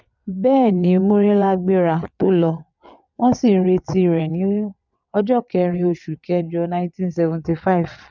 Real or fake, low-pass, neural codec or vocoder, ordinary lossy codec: fake; 7.2 kHz; vocoder, 22.05 kHz, 80 mel bands, WaveNeXt; none